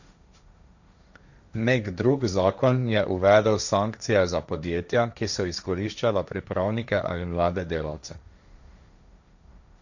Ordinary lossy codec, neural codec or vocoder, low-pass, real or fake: none; codec, 16 kHz, 1.1 kbps, Voila-Tokenizer; 7.2 kHz; fake